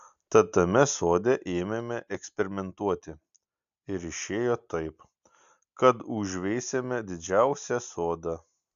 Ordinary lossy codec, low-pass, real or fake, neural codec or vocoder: AAC, 96 kbps; 7.2 kHz; real; none